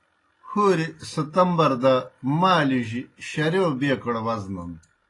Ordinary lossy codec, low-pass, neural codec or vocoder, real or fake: AAC, 32 kbps; 10.8 kHz; none; real